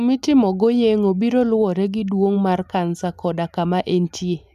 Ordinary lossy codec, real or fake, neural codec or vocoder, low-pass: MP3, 96 kbps; real; none; 14.4 kHz